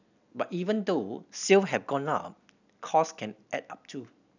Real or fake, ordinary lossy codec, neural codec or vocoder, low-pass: real; none; none; 7.2 kHz